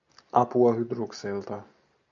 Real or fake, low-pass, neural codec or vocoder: real; 7.2 kHz; none